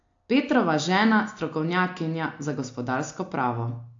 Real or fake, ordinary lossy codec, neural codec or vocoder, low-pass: real; AAC, 48 kbps; none; 7.2 kHz